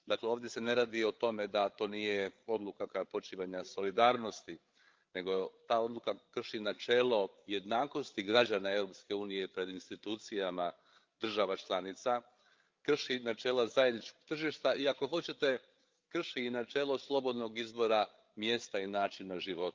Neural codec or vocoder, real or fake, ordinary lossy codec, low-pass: codec, 16 kHz, 8 kbps, FreqCodec, larger model; fake; Opus, 32 kbps; 7.2 kHz